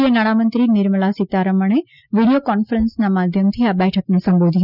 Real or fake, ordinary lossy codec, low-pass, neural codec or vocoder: real; none; 5.4 kHz; none